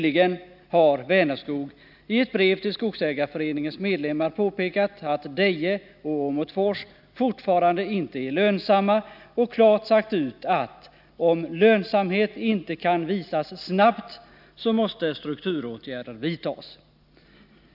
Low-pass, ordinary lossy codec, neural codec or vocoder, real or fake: 5.4 kHz; none; none; real